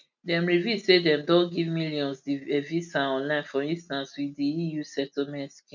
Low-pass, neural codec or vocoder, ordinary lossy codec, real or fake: 7.2 kHz; none; none; real